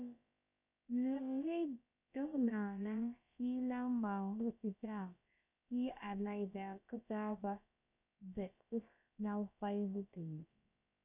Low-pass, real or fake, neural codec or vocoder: 3.6 kHz; fake; codec, 16 kHz, about 1 kbps, DyCAST, with the encoder's durations